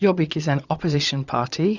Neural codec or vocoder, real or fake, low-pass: vocoder, 22.05 kHz, 80 mel bands, Vocos; fake; 7.2 kHz